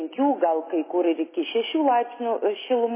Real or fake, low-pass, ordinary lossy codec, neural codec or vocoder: real; 3.6 kHz; MP3, 16 kbps; none